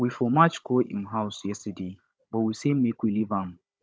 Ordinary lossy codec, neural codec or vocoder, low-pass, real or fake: none; codec, 16 kHz, 16 kbps, FunCodec, trained on Chinese and English, 50 frames a second; none; fake